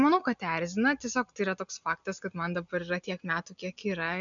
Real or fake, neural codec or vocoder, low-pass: real; none; 7.2 kHz